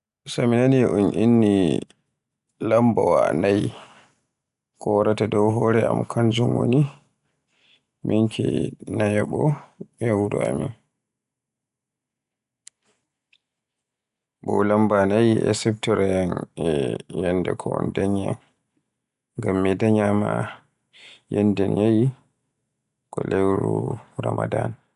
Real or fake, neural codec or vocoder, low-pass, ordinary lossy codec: real; none; 10.8 kHz; none